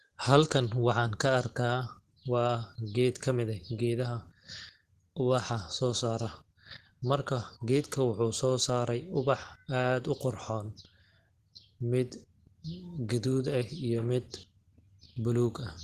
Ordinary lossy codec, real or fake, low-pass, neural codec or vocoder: Opus, 16 kbps; real; 14.4 kHz; none